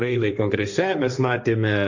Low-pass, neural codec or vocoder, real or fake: 7.2 kHz; codec, 16 kHz, 1.1 kbps, Voila-Tokenizer; fake